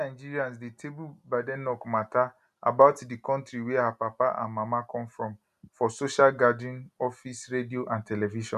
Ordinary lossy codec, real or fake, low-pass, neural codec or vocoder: none; real; 14.4 kHz; none